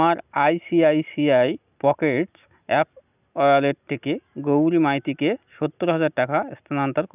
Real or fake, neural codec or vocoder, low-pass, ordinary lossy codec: real; none; 3.6 kHz; none